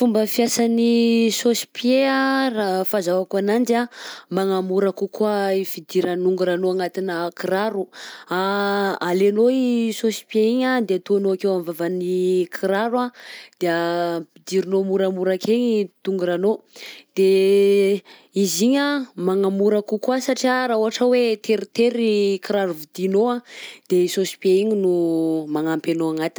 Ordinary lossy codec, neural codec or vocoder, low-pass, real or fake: none; none; none; real